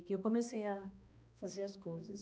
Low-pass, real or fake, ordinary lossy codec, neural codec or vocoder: none; fake; none; codec, 16 kHz, 1 kbps, X-Codec, HuBERT features, trained on balanced general audio